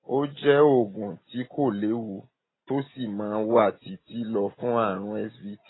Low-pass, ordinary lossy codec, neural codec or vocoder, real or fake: 7.2 kHz; AAC, 16 kbps; none; real